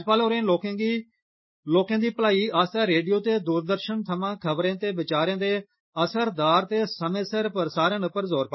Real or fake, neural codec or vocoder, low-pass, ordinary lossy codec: real; none; 7.2 kHz; MP3, 24 kbps